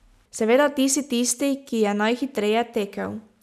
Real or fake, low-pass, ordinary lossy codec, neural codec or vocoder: real; 14.4 kHz; none; none